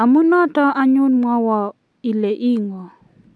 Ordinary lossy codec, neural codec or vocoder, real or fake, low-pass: none; none; real; none